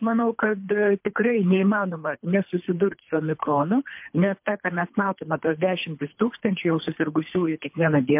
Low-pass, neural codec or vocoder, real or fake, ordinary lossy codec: 3.6 kHz; codec, 24 kHz, 3 kbps, HILCodec; fake; MP3, 32 kbps